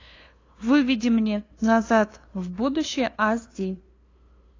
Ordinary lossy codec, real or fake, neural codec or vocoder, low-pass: AAC, 32 kbps; fake; codec, 16 kHz, 2 kbps, FunCodec, trained on LibriTTS, 25 frames a second; 7.2 kHz